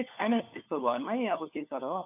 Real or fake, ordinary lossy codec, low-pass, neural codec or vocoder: fake; AAC, 32 kbps; 3.6 kHz; codec, 16 kHz, 4 kbps, FunCodec, trained on LibriTTS, 50 frames a second